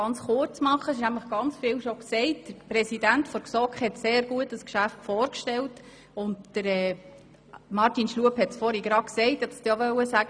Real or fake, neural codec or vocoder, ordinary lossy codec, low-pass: real; none; none; 9.9 kHz